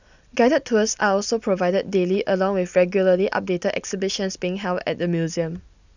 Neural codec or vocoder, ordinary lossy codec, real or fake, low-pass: none; none; real; 7.2 kHz